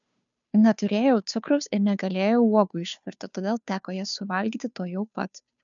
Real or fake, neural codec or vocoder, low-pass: fake; codec, 16 kHz, 2 kbps, FunCodec, trained on Chinese and English, 25 frames a second; 7.2 kHz